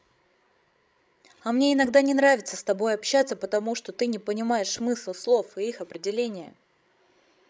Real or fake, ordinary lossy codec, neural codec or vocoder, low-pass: fake; none; codec, 16 kHz, 16 kbps, FreqCodec, larger model; none